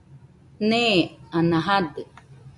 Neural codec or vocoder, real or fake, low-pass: none; real; 10.8 kHz